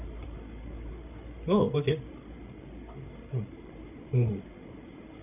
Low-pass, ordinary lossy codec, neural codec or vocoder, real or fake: 3.6 kHz; none; codec, 16 kHz, 8 kbps, FreqCodec, larger model; fake